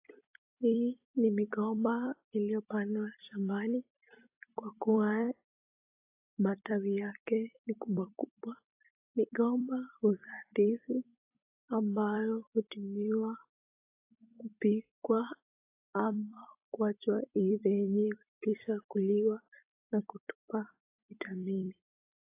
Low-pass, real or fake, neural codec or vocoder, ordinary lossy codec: 3.6 kHz; fake; vocoder, 44.1 kHz, 128 mel bands every 256 samples, BigVGAN v2; AAC, 24 kbps